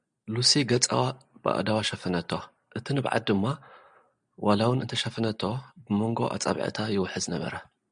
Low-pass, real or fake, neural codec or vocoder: 9.9 kHz; real; none